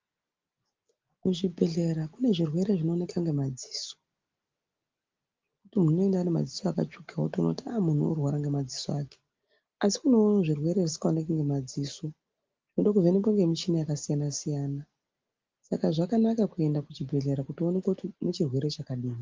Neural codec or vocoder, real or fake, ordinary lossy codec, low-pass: none; real; Opus, 32 kbps; 7.2 kHz